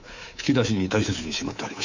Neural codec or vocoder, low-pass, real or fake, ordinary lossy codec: codec, 24 kHz, 3.1 kbps, DualCodec; 7.2 kHz; fake; Opus, 64 kbps